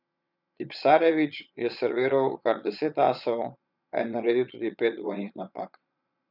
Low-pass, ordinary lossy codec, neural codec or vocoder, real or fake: 5.4 kHz; none; vocoder, 22.05 kHz, 80 mel bands, Vocos; fake